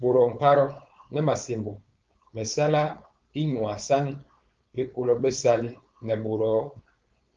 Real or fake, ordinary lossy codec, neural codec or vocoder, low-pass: fake; Opus, 32 kbps; codec, 16 kHz, 4.8 kbps, FACodec; 7.2 kHz